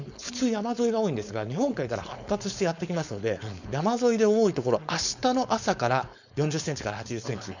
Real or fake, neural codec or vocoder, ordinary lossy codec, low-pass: fake; codec, 16 kHz, 4.8 kbps, FACodec; none; 7.2 kHz